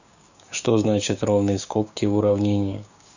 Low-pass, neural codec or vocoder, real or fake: 7.2 kHz; codec, 16 kHz, 6 kbps, DAC; fake